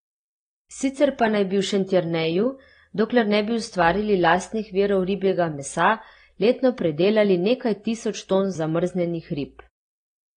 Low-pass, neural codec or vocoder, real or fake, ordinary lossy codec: 9.9 kHz; none; real; AAC, 32 kbps